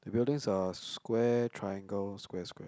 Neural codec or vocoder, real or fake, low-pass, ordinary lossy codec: none; real; none; none